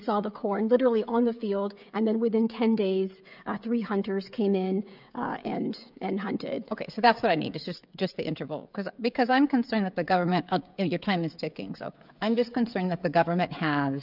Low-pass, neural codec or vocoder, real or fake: 5.4 kHz; codec, 16 kHz, 16 kbps, FreqCodec, smaller model; fake